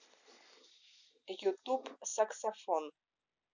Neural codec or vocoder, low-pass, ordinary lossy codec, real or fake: none; 7.2 kHz; none; real